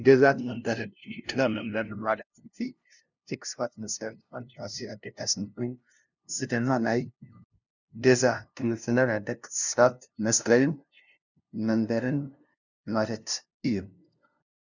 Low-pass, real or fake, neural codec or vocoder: 7.2 kHz; fake; codec, 16 kHz, 0.5 kbps, FunCodec, trained on LibriTTS, 25 frames a second